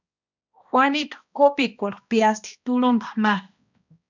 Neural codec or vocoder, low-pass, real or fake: codec, 16 kHz, 1 kbps, X-Codec, HuBERT features, trained on balanced general audio; 7.2 kHz; fake